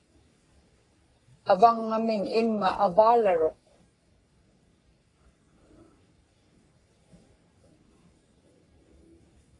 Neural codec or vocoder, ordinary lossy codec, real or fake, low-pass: codec, 44.1 kHz, 3.4 kbps, Pupu-Codec; AAC, 32 kbps; fake; 10.8 kHz